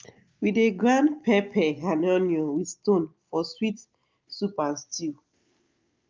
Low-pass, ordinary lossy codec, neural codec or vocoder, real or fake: 7.2 kHz; Opus, 24 kbps; none; real